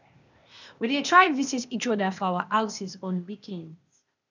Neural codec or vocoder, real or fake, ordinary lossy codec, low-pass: codec, 16 kHz, 0.8 kbps, ZipCodec; fake; none; 7.2 kHz